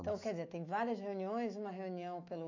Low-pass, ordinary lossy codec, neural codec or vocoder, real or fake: 7.2 kHz; AAC, 48 kbps; autoencoder, 48 kHz, 128 numbers a frame, DAC-VAE, trained on Japanese speech; fake